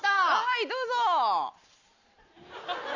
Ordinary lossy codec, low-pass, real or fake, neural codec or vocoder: none; 7.2 kHz; real; none